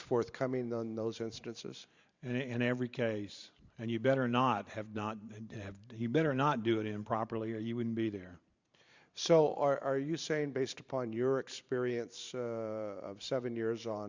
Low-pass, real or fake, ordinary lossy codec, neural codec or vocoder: 7.2 kHz; fake; Opus, 64 kbps; vocoder, 44.1 kHz, 128 mel bands every 512 samples, BigVGAN v2